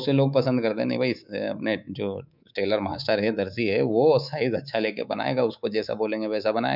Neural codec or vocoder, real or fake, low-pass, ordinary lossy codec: codec, 24 kHz, 3.1 kbps, DualCodec; fake; 5.4 kHz; none